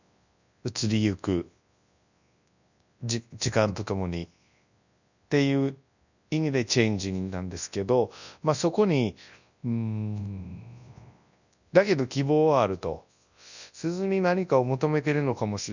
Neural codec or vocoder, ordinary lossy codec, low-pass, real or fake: codec, 24 kHz, 0.9 kbps, WavTokenizer, large speech release; none; 7.2 kHz; fake